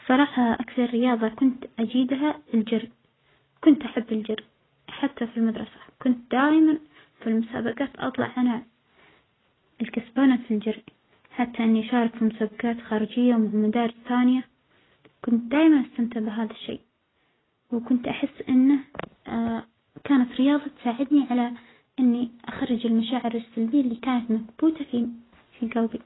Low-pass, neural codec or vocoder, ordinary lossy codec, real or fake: 7.2 kHz; none; AAC, 16 kbps; real